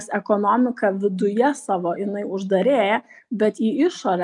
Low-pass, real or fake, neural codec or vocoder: 10.8 kHz; real; none